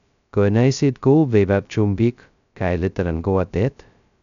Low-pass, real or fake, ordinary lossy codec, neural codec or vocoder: 7.2 kHz; fake; none; codec, 16 kHz, 0.2 kbps, FocalCodec